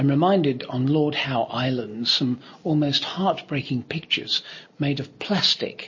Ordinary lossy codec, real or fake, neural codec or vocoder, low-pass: MP3, 32 kbps; real; none; 7.2 kHz